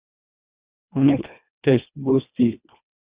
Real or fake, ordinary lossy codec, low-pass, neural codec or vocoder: fake; Opus, 64 kbps; 3.6 kHz; codec, 24 kHz, 1.5 kbps, HILCodec